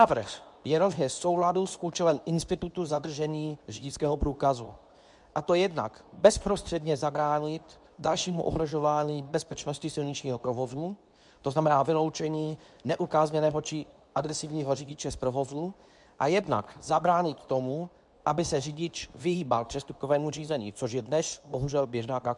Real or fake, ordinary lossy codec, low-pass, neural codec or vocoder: fake; MP3, 96 kbps; 10.8 kHz; codec, 24 kHz, 0.9 kbps, WavTokenizer, medium speech release version 2